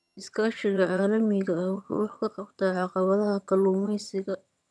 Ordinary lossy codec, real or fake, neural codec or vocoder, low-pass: none; fake; vocoder, 22.05 kHz, 80 mel bands, HiFi-GAN; none